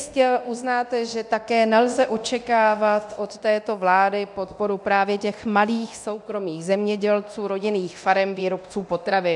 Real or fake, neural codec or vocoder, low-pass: fake; codec, 24 kHz, 0.9 kbps, DualCodec; 10.8 kHz